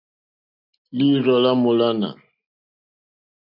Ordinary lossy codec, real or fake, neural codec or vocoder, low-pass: AAC, 48 kbps; real; none; 5.4 kHz